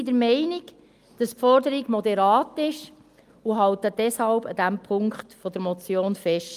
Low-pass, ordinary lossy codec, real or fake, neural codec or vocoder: 14.4 kHz; Opus, 32 kbps; real; none